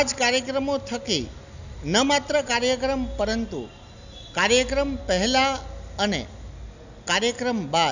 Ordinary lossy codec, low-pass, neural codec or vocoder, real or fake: none; 7.2 kHz; none; real